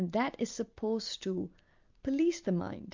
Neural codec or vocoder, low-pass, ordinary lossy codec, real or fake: none; 7.2 kHz; MP3, 64 kbps; real